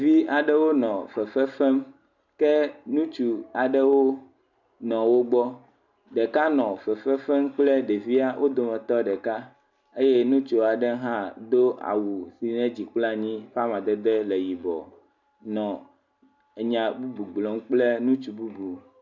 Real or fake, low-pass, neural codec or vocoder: real; 7.2 kHz; none